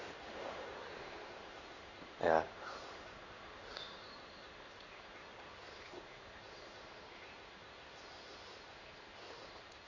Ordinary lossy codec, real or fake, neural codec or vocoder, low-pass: none; fake; codec, 16 kHz in and 24 kHz out, 1 kbps, XY-Tokenizer; 7.2 kHz